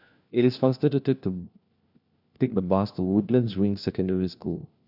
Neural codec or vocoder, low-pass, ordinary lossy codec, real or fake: codec, 16 kHz, 1 kbps, FunCodec, trained on LibriTTS, 50 frames a second; 5.4 kHz; none; fake